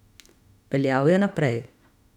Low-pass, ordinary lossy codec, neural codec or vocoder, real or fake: 19.8 kHz; none; autoencoder, 48 kHz, 32 numbers a frame, DAC-VAE, trained on Japanese speech; fake